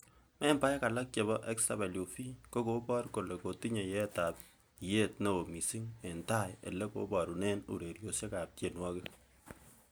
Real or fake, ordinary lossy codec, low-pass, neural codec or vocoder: real; none; none; none